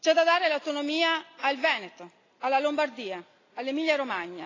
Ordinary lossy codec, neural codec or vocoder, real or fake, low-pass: AAC, 32 kbps; none; real; 7.2 kHz